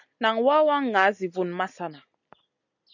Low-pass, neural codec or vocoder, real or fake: 7.2 kHz; none; real